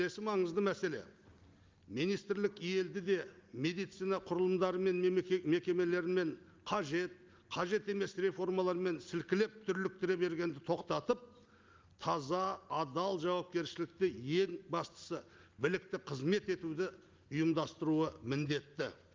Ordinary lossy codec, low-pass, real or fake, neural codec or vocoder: Opus, 32 kbps; 7.2 kHz; real; none